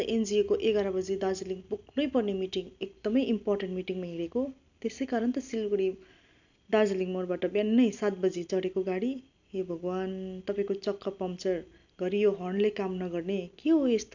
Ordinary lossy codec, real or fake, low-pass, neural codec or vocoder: none; real; 7.2 kHz; none